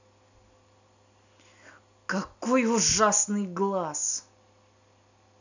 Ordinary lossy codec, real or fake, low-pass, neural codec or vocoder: none; real; 7.2 kHz; none